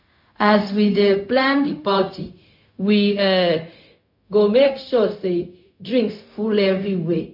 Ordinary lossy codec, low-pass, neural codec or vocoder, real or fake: MP3, 48 kbps; 5.4 kHz; codec, 16 kHz, 0.4 kbps, LongCat-Audio-Codec; fake